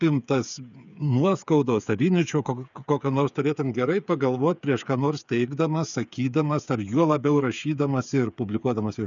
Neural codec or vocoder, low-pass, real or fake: codec, 16 kHz, 8 kbps, FreqCodec, smaller model; 7.2 kHz; fake